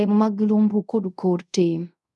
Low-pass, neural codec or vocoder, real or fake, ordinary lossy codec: none; codec, 24 kHz, 0.5 kbps, DualCodec; fake; none